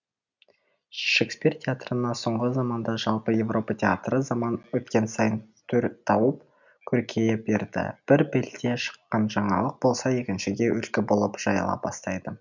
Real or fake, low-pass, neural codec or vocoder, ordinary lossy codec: real; 7.2 kHz; none; none